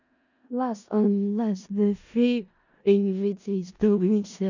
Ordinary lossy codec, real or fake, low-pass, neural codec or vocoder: none; fake; 7.2 kHz; codec, 16 kHz in and 24 kHz out, 0.4 kbps, LongCat-Audio-Codec, four codebook decoder